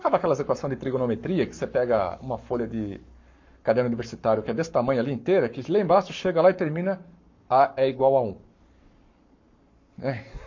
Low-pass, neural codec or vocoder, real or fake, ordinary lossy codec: 7.2 kHz; codec, 44.1 kHz, 7.8 kbps, Pupu-Codec; fake; MP3, 48 kbps